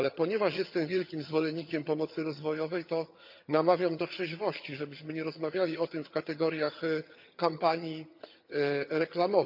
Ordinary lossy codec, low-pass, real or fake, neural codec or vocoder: none; 5.4 kHz; fake; vocoder, 22.05 kHz, 80 mel bands, HiFi-GAN